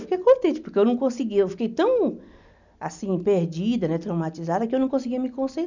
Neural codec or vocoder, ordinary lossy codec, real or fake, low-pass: none; none; real; 7.2 kHz